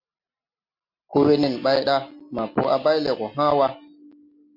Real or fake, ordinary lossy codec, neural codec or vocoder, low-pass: real; MP3, 32 kbps; none; 5.4 kHz